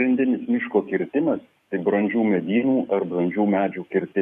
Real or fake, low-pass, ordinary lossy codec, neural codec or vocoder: fake; 14.4 kHz; MP3, 64 kbps; codec, 44.1 kHz, 7.8 kbps, DAC